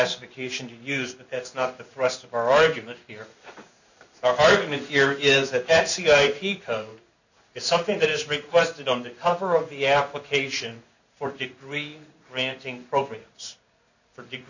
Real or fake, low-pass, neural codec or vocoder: fake; 7.2 kHz; codec, 16 kHz in and 24 kHz out, 1 kbps, XY-Tokenizer